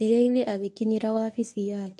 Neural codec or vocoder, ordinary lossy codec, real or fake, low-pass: codec, 24 kHz, 0.9 kbps, WavTokenizer, medium speech release version 1; none; fake; none